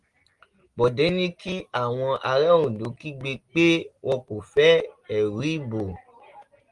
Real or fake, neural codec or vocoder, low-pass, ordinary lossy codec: real; none; 10.8 kHz; Opus, 24 kbps